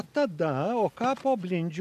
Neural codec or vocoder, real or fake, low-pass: none; real; 14.4 kHz